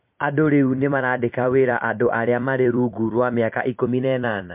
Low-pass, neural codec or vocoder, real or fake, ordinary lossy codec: 3.6 kHz; vocoder, 44.1 kHz, 128 mel bands every 256 samples, BigVGAN v2; fake; MP3, 32 kbps